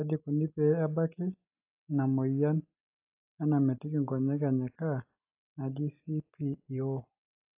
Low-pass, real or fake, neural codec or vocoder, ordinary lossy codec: 3.6 kHz; real; none; AAC, 32 kbps